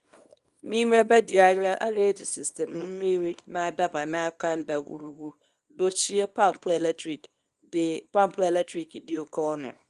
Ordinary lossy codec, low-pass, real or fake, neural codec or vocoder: Opus, 32 kbps; 10.8 kHz; fake; codec, 24 kHz, 0.9 kbps, WavTokenizer, small release